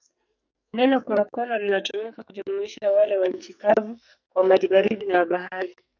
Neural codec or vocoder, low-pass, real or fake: codec, 44.1 kHz, 2.6 kbps, SNAC; 7.2 kHz; fake